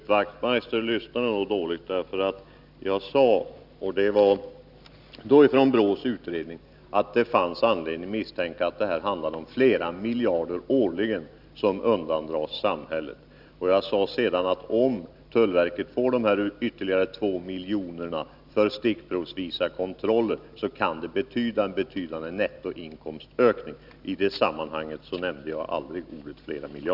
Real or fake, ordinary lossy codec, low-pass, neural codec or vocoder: real; none; 5.4 kHz; none